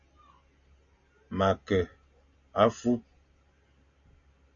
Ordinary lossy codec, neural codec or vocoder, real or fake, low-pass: AAC, 64 kbps; none; real; 7.2 kHz